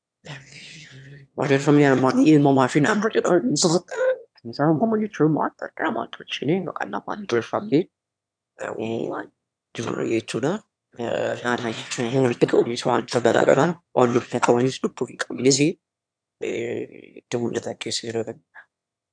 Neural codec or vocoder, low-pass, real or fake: autoencoder, 22.05 kHz, a latent of 192 numbers a frame, VITS, trained on one speaker; 9.9 kHz; fake